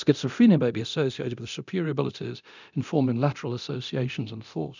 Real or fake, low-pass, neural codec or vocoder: fake; 7.2 kHz; codec, 24 kHz, 0.9 kbps, DualCodec